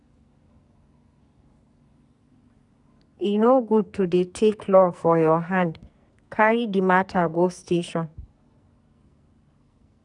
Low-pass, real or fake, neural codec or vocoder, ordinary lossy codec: 10.8 kHz; fake; codec, 44.1 kHz, 2.6 kbps, SNAC; none